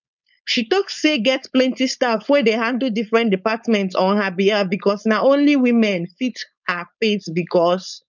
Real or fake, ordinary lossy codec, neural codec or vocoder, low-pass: fake; none; codec, 16 kHz, 4.8 kbps, FACodec; 7.2 kHz